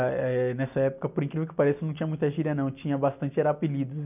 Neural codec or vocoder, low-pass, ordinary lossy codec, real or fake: none; 3.6 kHz; none; real